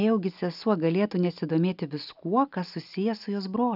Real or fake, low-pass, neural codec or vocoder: real; 5.4 kHz; none